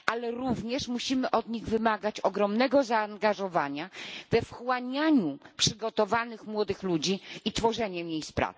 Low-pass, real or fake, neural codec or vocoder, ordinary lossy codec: none; real; none; none